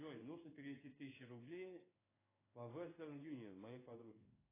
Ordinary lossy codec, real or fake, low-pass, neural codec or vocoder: AAC, 16 kbps; fake; 3.6 kHz; codec, 16 kHz in and 24 kHz out, 1 kbps, XY-Tokenizer